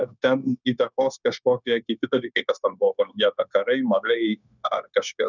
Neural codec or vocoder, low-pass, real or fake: codec, 16 kHz, 0.9 kbps, LongCat-Audio-Codec; 7.2 kHz; fake